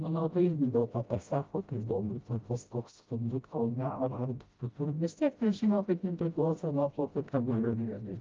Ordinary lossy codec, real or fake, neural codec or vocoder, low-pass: Opus, 24 kbps; fake; codec, 16 kHz, 0.5 kbps, FreqCodec, smaller model; 7.2 kHz